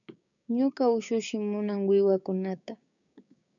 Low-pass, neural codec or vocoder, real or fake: 7.2 kHz; codec, 16 kHz, 6 kbps, DAC; fake